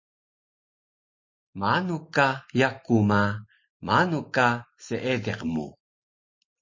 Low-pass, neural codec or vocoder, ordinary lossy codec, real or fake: 7.2 kHz; none; MP3, 32 kbps; real